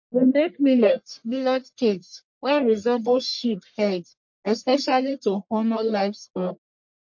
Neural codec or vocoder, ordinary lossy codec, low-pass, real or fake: codec, 44.1 kHz, 1.7 kbps, Pupu-Codec; MP3, 48 kbps; 7.2 kHz; fake